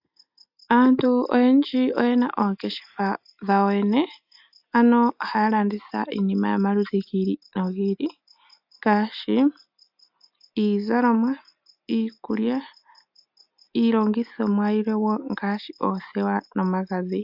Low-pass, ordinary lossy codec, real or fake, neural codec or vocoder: 5.4 kHz; AAC, 48 kbps; real; none